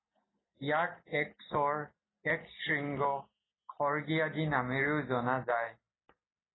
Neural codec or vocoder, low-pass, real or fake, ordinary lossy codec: none; 7.2 kHz; real; AAC, 16 kbps